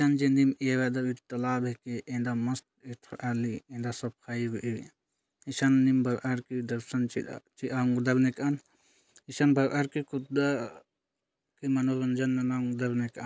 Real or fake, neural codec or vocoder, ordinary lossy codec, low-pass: real; none; none; none